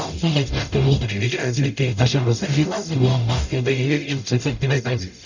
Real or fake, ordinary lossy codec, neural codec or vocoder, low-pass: fake; none; codec, 44.1 kHz, 0.9 kbps, DAC; 7.2 kHz